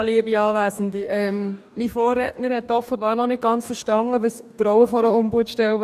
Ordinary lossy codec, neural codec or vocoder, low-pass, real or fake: none; codec, 44.1 kHz, 2.6 kbps, DAC; 14.4 kHz; fake